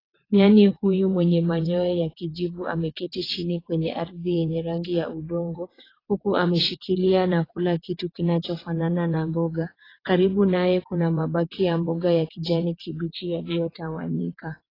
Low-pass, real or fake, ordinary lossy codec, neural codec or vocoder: 5.4 kHz; fake; AAC, 24 kbps; vocoder, 22.05 kHz, 80 mel bands, WaveNeXt